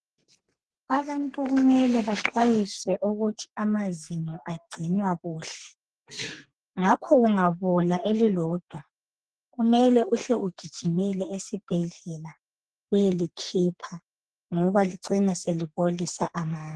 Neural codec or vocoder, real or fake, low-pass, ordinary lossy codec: codec, 32 kHz, 1.9 kbps, SNAC; fake; 10.8 kHz; Opus, 16 kbps